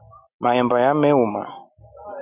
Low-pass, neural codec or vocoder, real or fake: 3.6 kHz; none; real